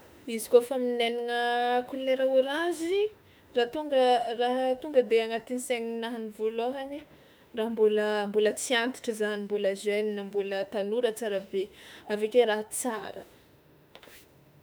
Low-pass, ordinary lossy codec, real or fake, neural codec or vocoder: none; none; fake; autoencoder, 48 kHz, 32 numbers a frame, DAC-VAE, trained on Japanese speech